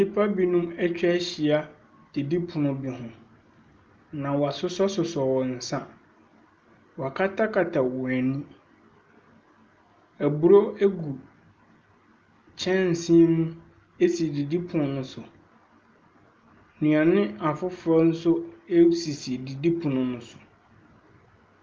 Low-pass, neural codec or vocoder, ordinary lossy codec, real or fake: 7.2 kHz; none; Opus, 24 kbps; real